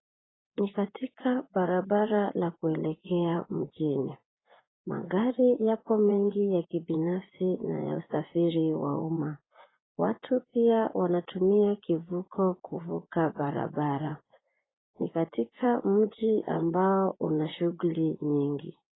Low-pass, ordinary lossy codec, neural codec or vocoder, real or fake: 7.2 kHz; AAC, 16 kbps; vocoder, 24 kHz, 100 mel bands, Vocos; fake